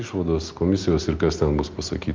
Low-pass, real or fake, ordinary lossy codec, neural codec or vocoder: 7.2 kHz; real; Opus, 32 kbps; none